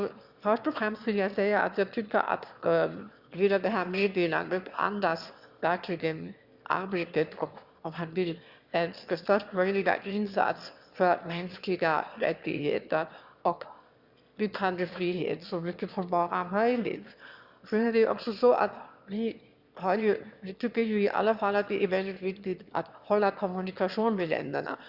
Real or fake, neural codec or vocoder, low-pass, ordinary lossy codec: fake; autoencoder, 22.05 kHz, a latent of 192 numbers a frame, VITS, trained on one speaker; 5.4 kHz; Opus, 64 kbps